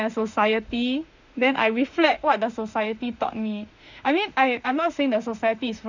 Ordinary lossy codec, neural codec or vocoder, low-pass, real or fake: Opus, 64 kbps; autoencoder, 48 kHz, 32 numbers a frame, DAC-VAE, trained on Japanese speech; 7.2 kHz; fake